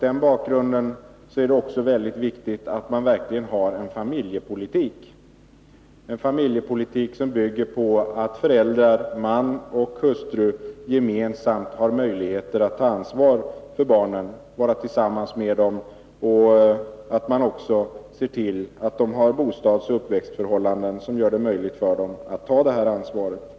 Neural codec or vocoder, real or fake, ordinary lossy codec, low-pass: none; real; none; none